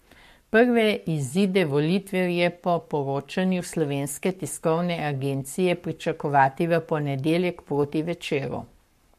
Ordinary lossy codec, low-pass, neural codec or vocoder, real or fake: MP3, 64 kbps; 14.4 kHz; codec, 44.1 kHz, 7.8 kbps, Pupu-Codec; fake